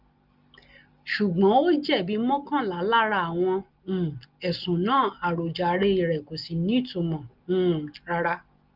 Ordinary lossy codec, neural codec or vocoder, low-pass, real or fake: Opus, 32 kbps; none; 5.4 kHz; real